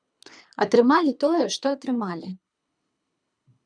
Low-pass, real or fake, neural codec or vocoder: 9.9 kHz; fake; codec, 24 kHz, 3 kbps, HILCodec